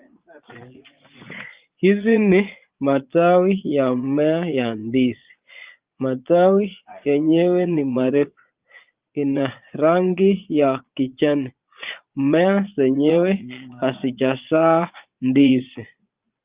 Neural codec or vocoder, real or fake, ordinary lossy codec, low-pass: vocoder, 22.05 kHz, 80 mel bands, Vocos; fake; Opus, 32 kbps; 3.6 kHz